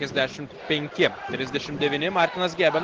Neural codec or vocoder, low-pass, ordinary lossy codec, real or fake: none; 7.2 kHz; Opus, 16 kbps; real